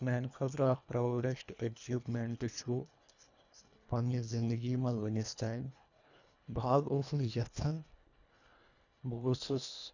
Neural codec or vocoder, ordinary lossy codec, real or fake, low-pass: codec, 24 kHz, 1.5 kbps, HILCodec; none; fake; 7.2 kHz